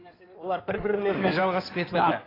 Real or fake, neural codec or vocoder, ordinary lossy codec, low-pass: fake; codec, 16 kHz in and 24 kHz out, 2.2 kbps, FireRedTTS-2 codec; AAC, 24 kbps; 5.4 kHz